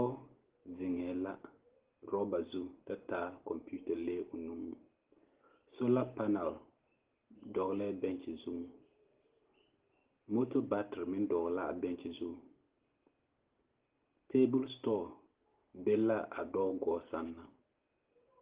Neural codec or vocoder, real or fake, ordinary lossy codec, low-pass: none; real; Opus, 16 kbps; 3.6 kHz